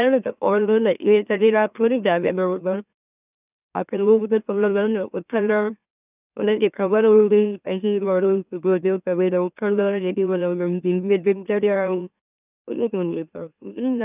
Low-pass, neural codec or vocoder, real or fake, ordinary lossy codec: 3.6 kHz; autoencoder, 44.1 kHz, a latent of 192 numbers a frame, MeloTTS; fake; none